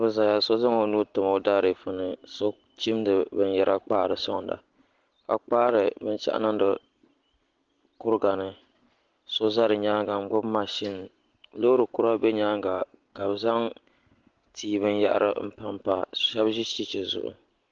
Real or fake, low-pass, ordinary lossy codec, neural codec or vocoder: real; 7.2 kHz; Opus, 16 kbps; none